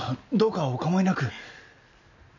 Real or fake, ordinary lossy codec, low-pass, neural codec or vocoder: real; none; 7.2 kHz; none